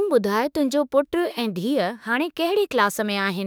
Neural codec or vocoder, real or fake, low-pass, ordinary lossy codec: autoencoder, 48 kHz, 32 numbers a frame, DAC-VAE, trained on Japanese speech; fake; none; none